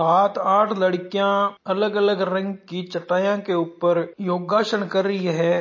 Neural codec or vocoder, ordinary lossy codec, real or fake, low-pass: none; MP3, 32 kbps; real; 7.2 kHz